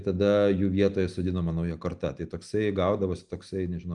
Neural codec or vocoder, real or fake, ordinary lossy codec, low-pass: none; real; Opus, 32 kbps; 10.8 kHz